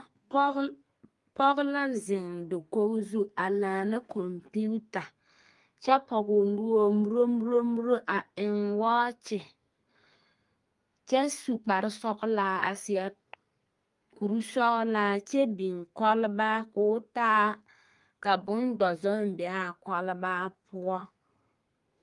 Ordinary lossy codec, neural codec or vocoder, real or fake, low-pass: Opus, 32 kbps; codec, 32 kHz, 1.9 kbps, SNAC; fake; 10.8 kHz